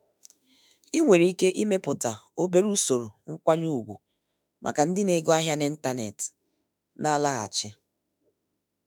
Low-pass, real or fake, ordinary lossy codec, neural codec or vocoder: none; fake; none; autoencoder, 48 kHz, 32 numbers a frame, DAC-VAE, trained on Japanese speech